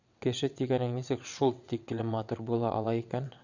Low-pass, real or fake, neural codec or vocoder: 7.2 kHz; fake; vocoder, 22.05 kHz, 80 mel bands, WaveNeXt